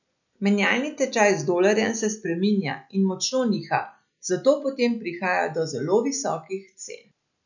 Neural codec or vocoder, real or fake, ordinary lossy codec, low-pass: none; real; none; 7.2 kHz